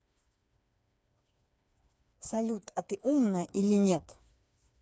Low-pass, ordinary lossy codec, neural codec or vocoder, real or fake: none; none; codec, 16 kHz, 4 kbps, FreqCodec, smaller model; fake